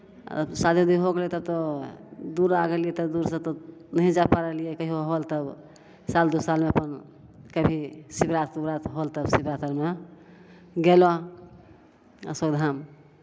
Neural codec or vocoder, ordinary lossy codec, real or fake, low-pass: none; none; real; none